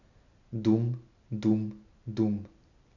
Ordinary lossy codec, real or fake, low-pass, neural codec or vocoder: none; real; 7.2 kHz; none